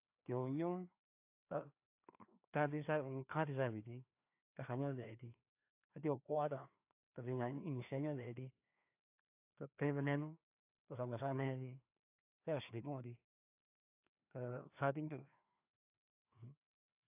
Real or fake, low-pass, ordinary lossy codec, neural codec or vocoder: fake; 3.6 kHz; AAC, 32 kbps; codec, 16 kHz, 2 kbps, FreqCodec, larger model